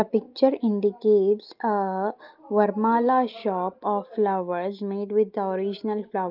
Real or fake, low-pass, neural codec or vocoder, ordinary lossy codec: real; 5.4 kHz; none; Opus, 32 kbps